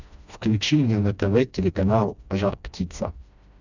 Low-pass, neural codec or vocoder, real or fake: 7.2 kHz; codec, 16 kHz, 1 kbps, FreqCodec, smaller model; fake